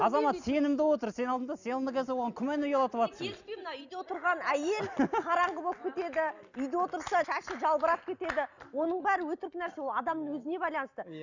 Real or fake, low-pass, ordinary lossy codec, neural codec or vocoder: real; 7.2 kHz; none; none